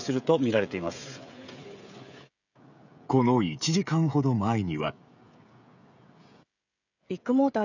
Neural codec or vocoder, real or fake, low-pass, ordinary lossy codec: codec, 16 kHz, 16 kbps, FreqCodec, smaller model; fake; 7.2 kHz; none